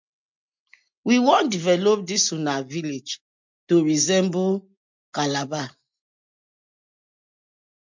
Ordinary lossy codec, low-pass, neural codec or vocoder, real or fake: MP3, 64 kbps; 7.2 kHz; none; real